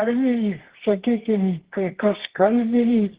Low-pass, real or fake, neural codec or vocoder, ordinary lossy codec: 3.6 kHz; fake; codec, 24 kHz, 0.9 kbps, WavTokenizer, medium music audio release; Opus, 16 kbps